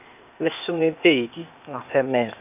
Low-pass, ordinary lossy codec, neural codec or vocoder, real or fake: 3.6 kHz; none; codec, 16 kHz, 0.8 kbps, ZipCodec; fake